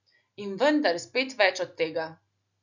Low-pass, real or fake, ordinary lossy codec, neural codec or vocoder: 7.2 kHz; real; none; none